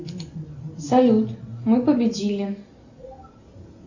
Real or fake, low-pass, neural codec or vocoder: real; 7.2 kHz; none